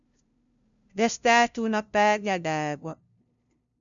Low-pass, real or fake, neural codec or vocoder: 7.2 kHz; fake; codec, 16 kHz, 0.5 kbps, FunCodec, trained on LibriTTS, 25 frames a second